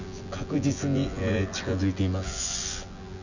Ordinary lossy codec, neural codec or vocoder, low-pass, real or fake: none; vocoder, 24 kHz, 100 mel bands, Vocos; 7.2 kHz; fake